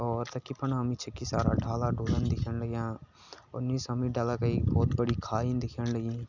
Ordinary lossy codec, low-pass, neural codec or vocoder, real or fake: none; 7.2 kHz; none; real